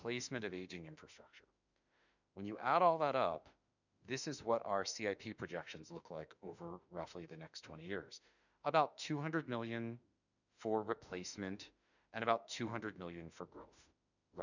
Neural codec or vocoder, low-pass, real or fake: autoencoder, 48 kHz, 32 numbers a frame, DAC-VAE, trained on Japanese speech; 7.2 kHz; fake